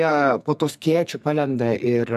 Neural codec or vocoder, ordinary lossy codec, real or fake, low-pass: codec, 44.1 kHz, 2.6 kbps, SNAC; AAC, 96 kbps; fake; 14.4 kHz